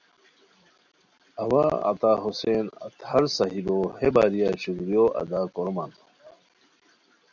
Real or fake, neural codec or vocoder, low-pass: real; none; 7.2 kHz